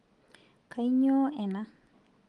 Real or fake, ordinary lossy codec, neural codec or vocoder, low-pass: real; Opus, 24 kbps; none; 10.8 kHz